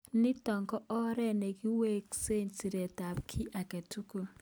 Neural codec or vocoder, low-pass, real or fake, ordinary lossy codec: none; none; real; none